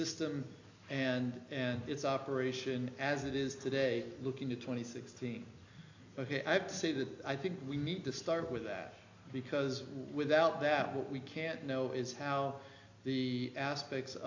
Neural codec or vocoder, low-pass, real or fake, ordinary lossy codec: none; 7.2 kHz; real; AAC, 48 kbps